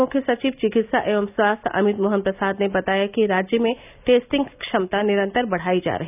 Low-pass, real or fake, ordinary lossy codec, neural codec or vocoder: 3.6 kHz; real; none; none